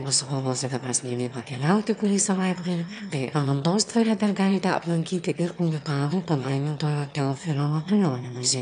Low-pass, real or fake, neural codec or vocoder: 9.9 kHz; fake; autoencoder, 22.05 kHz, a latent of 192 numbers a frame, VITS, trained on one speaker